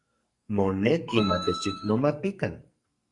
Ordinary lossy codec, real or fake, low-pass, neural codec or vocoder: Opus, 64 kbps; fake; 10.8 kHz; codec, 44.1 kHz, 2.6 kbps, SNAC